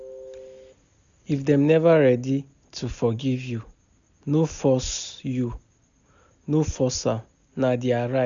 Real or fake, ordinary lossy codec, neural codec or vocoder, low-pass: real; none; none; 7.2 kHz